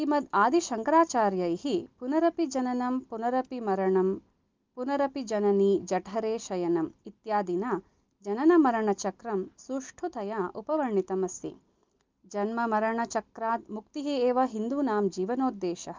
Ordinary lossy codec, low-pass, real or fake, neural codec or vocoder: Opus, 32 kbps; 7.2 kHz; real; none